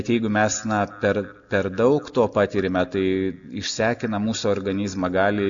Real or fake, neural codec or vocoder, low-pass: real; none; 7.2 kHz